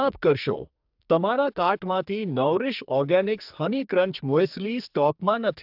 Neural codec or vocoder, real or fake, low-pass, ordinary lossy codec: codec, 44.1 kHz, 2.6 kbps, SNAC; fake; 5.4 kHz; AAC, 48 kbps